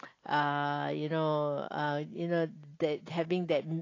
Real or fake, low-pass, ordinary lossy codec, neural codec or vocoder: real; 7.2 kHz; AAC, 32 kbps; none